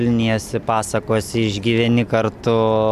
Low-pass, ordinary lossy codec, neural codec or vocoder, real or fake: 14.4 kHz; AAC, 96 kbps; none; real